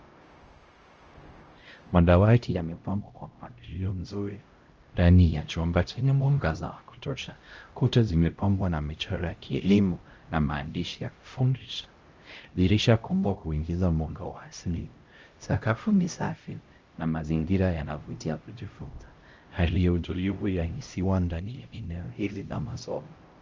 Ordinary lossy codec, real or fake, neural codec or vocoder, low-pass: Opus, 24 kbps; fake; codec, 16 kHz, 0.5 kbps, X-Codec, HuBERT features, trained on LibriSpeech; 7.2 kHz